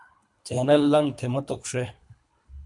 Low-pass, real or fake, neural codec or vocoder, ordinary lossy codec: 10.8 kHz; fake; codec, 24 kHz, 3 kbps, HILCodec; MP3, 64 kbps